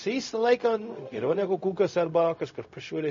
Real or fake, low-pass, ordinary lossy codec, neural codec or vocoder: fake; 7.2 kHz; MP3, 32 kbps; codec, 16 kHz, 0.4 kbps, LongCat-Audio-Codec